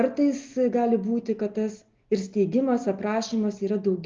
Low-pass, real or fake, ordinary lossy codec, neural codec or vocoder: 7.2 kHz; real; Opus, 24 kbps; none